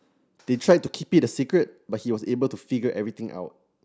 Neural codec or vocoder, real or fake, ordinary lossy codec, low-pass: none; real; none; none